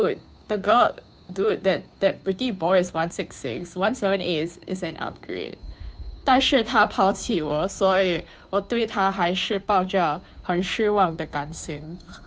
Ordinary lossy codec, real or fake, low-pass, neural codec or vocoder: none; fake; none; codec, 16 kHz, 2 kbps, FunCodec, trained on Chinese and English, 25 frames a second